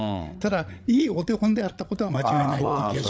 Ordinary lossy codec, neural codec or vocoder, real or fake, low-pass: none; codec, 16 kHz, 8 kbps, FreqCodec, larger model; fake; none